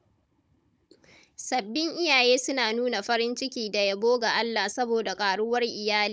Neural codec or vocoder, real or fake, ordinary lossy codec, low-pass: codec, 16 kHz, 16 kbps, FunCodec, trained on Chinese and English, 50 frames a second; fake; none; none